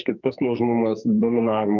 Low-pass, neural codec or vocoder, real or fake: 7.2 kHz; codec, 44.1 kHz, 2.6 kbps, SNAC; fake